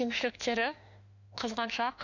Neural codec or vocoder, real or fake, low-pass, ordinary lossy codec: autoencoder, 48 kHz, 32 numbers a frame, DAC-VAE, trained on Japanese speech; fake; 7.2 kHz; none